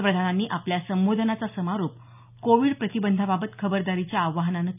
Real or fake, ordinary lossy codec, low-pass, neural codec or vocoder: real; none; 3.6 kHz; none